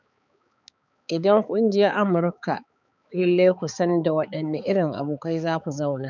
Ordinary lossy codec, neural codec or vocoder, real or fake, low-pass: none; codec, 16 kHz, 4 kbps, X-Codec, HuBERT features, trained on balanced general audio; fake; 7.2 kHz